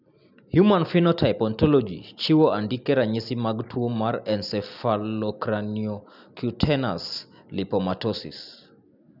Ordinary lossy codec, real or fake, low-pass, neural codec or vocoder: none; real; 5.4 kHz; none